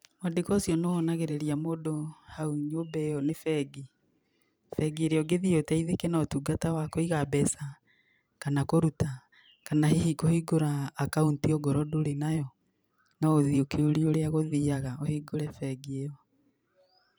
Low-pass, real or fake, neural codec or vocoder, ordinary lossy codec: none; fake; vocoder, 44.1 kHz, 128 mel bands every 512 samples, BigVGAN v2; none